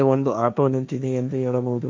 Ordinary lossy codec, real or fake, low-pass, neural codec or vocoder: none; fake; none; codec, 16 kHz, 1.1 kbps, Voila-Tokenizer